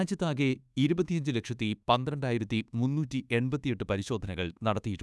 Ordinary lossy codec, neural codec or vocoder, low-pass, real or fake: none; codec, 24 kHz, 1.2 kbps, DualCodec; none; fake